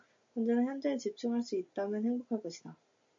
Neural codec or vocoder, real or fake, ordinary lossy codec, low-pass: none; real; MP3, 48 kbps; 7.2 kHz